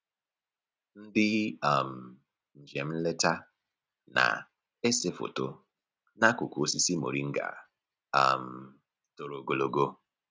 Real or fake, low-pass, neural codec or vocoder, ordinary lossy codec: real; none; none; none